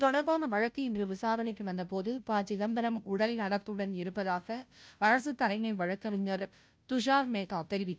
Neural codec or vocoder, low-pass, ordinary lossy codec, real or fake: codec, 16 kHz, 0.5 kbps, FunCodec, trained on Chinese and English, 25 frames a second; none; none; fake